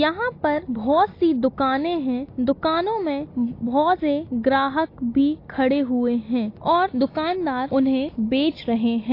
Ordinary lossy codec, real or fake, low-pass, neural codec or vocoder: AAC, 32 kbps; real; 5.4 kHz; none